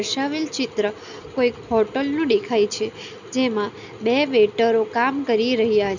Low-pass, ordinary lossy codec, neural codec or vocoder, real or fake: 7.2 kHz; none; none; real